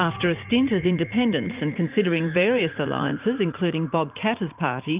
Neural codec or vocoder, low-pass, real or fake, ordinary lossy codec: vocoder, 44.1 kHz, 80 mel bands, Vocos; 3.6 kHz; fake; Opus, 24 kbps